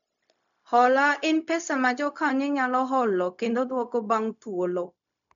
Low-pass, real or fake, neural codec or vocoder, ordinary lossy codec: 7.2 kHz; fake; codec, 16 kHz, 0.4 kbps, LongCat-Audio-Codec; none